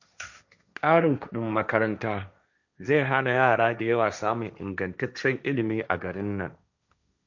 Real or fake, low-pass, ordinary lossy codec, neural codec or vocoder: fake; 7.2 kHz; none; codec, 16 kHz, 1.1 kbps, Voila-Tokenizer